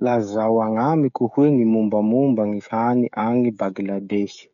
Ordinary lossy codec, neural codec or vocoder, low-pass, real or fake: none; codec, 16 kHz, 16 kbps, FreqCodec, smaller model; 7.2 kHz; fake